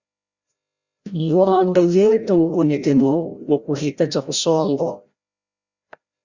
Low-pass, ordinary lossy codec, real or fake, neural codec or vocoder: 7.2 kHz; Opus, 64 kbps; fake; codec, 16 kHz, 0.5 kbps, FreqCodec, larger model